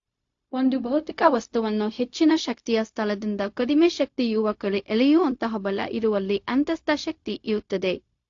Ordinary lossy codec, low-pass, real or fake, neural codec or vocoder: AAC, 48 kbps; 7.2 kHz; fake; codec, 16 kHz, 0.4 kbps, LongCat-Audio-Codec